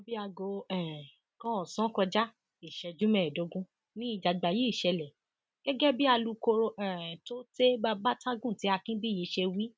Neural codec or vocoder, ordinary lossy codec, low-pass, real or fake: none; none; none; real